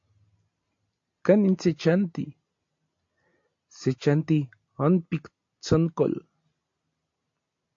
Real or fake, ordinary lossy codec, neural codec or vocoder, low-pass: real; AAC, 48 kbps; none; 7.2 kHz